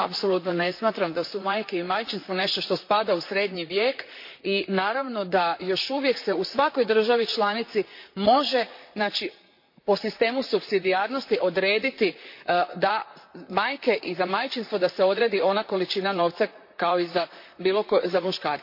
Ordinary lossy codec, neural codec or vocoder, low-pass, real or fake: MP3, 32 kbps; vocoder, 44.1 kHz, 128 mel bands, Pupu-Vocoder; 5.4 kHz; fake